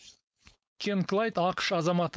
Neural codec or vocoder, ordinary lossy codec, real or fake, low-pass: codec, 16 kHz, 4.8 kbps, FACodec; none; fake; none